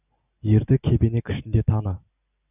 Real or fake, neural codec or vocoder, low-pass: real; none; 3.6 kHz